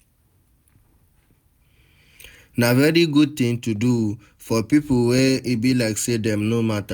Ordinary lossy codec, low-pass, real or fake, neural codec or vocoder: none; none; real; none